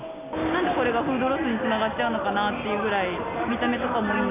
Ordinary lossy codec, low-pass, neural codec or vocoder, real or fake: AAC, 32 kbps; 3.6 kHz; none; real